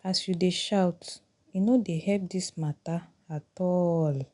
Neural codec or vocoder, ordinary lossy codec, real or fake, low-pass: none; none; real; 10.8 kHz